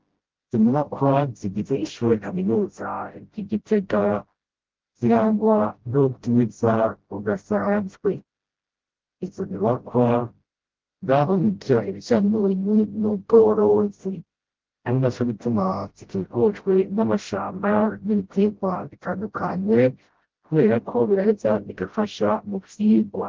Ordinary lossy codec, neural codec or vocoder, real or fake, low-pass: Opus, 16 kbps; codec, 16 kHz, 0.5 kbps, FreqCodec, smaller model; fake; 7.2 kHz